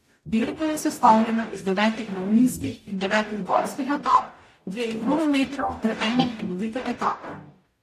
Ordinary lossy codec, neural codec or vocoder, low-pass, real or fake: AAC, 64 kbps; codec, 44.1 kHz, 0.9 kbps, DAC; 14.4 kHz; fake